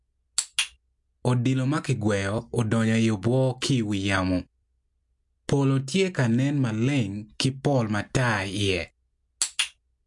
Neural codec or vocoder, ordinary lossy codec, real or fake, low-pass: none; MP3, 64 kbps; real; 10.8 kHz